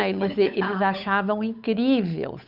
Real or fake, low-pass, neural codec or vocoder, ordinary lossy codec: fake; 5.4 kHz; codec, 16 kHz, 8 kbps, FunCodec, trained on Chinese and English, 25 frames a second; none